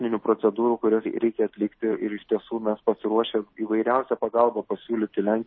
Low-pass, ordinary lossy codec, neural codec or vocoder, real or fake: 7.2 kHz; MP3, 24 kbps; none; real